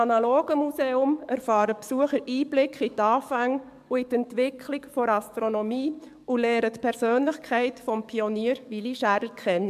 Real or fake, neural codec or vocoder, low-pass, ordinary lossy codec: fake; codec, 44.1 kHz, 7.8 kbps, DAC; 14.4 kHz; MP3, 96 kbps